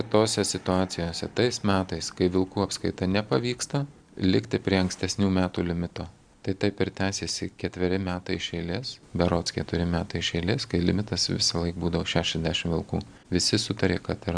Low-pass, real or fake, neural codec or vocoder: 9.9 kHz; real; none